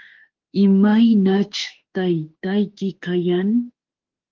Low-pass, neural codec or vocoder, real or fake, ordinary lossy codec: 7.2 kHz; autoencoder, 48 kHz, 32 numbers a frame, DAC-VAE, trained on Japanese speech; fake; Opus, 16 kbps